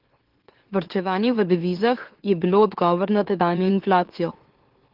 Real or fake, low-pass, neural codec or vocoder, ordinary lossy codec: fake; 5.4 kHz; autoencoder, 44.1 kHz, a latent of 192 numbers a frame, MeloTTS; Opus, 16 kbps